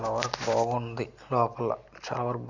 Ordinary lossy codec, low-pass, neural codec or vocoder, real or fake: none; 7.2 kHz; none; real